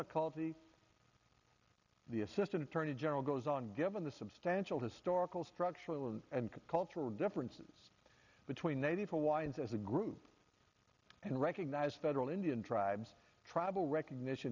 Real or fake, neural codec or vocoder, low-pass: real; none; 7.2 kHz